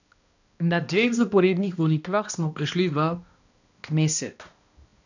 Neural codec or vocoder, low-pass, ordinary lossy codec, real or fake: codec, 16 kHz, 1 kbps, X-Codec, HuBERT features, trained on balanced general audio; 7.2 kHz; none; fake